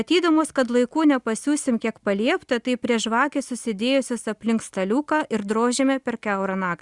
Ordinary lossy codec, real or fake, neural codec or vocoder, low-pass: Opus, 64 kbps; real; none; 10.8 kHz